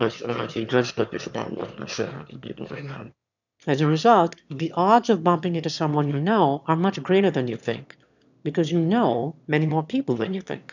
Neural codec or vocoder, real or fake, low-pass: autoencoder, 22.05 kHz, a latent of 192 numbers a frame, VITS, trained on one speaker; fake; 7.2 kHz